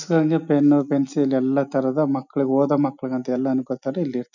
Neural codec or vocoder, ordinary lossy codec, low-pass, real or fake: none; none; 7.2 kHz; real